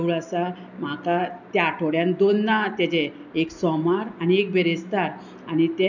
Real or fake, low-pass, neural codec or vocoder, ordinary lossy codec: real; 7.2 kHz; none; none